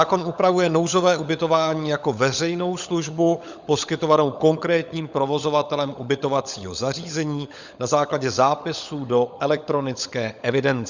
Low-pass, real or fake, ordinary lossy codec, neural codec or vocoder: 7.2 kHz; fake; Opus, 64 kbps; codec, 16 kHz, 16 kbps, FunCodec, trained on LibriTTS, 50 frames a second